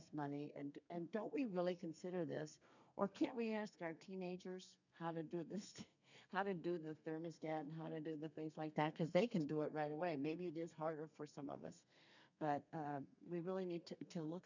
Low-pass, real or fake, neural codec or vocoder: 7.2 kHz; fake; codec, 32 kHz, 1.9 kbps, SNAC